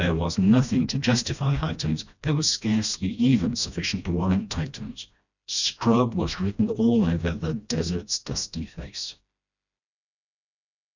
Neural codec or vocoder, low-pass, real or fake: codec, 16 kHz, 1 kbps, FreqCodec, smaller model; 7.2 kHz; fake